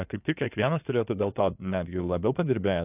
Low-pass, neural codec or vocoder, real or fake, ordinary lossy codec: 3.6 kHz; codec, 16 kHz in and 24 kHz out, 2.2 kbps, FireRedTTS-2 codec; fake; AAC, 32 kbps